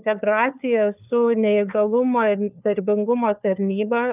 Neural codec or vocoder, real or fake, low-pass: codec, 16 kHz, 4 kbps, FunCodec, trained on LibriTTS, 50 frames a second; fake; 3.6 kHz